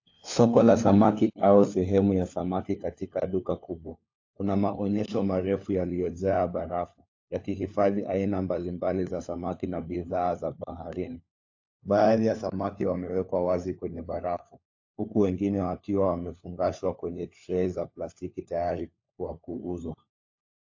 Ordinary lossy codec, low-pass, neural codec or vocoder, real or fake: AAC, 48 kbps; 7.2 kHz; codec, 16 kHz, 4 kbps, FunCodec, trained on LibriTTS, 50 frames a second; fake